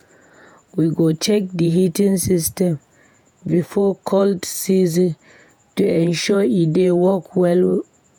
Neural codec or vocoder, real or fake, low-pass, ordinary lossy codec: vocoder, 48 kHz, 128 mel bands, Vocos; fake; 19.8 kHz; none